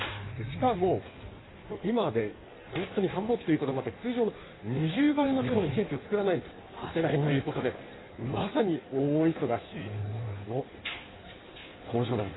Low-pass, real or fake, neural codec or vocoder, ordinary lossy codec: 7.2 kHz; fake; codec, 16 kHz in and 24 kHz out, 1.1 kbps, FireRedTTS-2 codec; AAC, 16 kbps